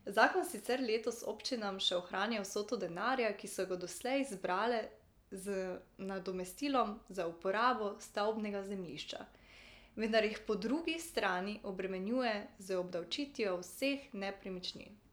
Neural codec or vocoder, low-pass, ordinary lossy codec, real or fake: none; none; none; real